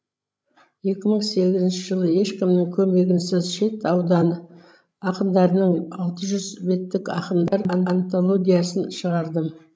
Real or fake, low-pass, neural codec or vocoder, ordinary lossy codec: fake; none; codec, 16 kHz, 16 kbps, FreqCodec, larger model; none